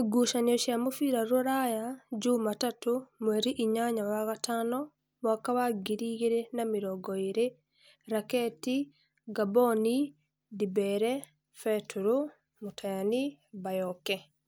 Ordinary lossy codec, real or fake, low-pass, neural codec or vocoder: none; real; none; none